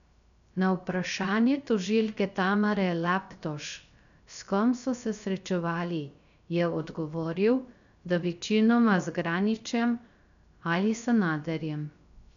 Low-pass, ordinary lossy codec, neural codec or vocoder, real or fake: 7.2 kHz; none; codec, 16 kHz, 0.3 kbps, FocalCodec; fake